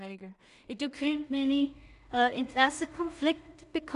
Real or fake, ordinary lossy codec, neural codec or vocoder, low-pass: fake; none; codec, 16 kHz in and 24 kHz out, 0.4 kbps, LongCat-Audio-Codec, two codebook decoder; 10.8 kHz